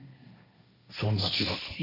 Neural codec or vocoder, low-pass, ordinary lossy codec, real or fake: codec, 16 kHz, 1.1 kbps, Voila-Tokenizer; 5.4 kHz; none; fake